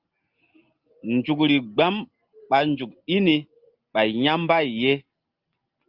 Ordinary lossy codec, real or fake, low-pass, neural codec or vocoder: Opus, 32 kbps; real; 5.4 kHz; none